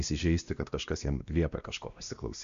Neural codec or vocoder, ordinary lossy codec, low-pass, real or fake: codec, 16 kHz, 1 kbps, X-Codec, WavLM features, trained on Multilingual LibriSpeech; Opus, 64 kbps; 7.2 kHz; fake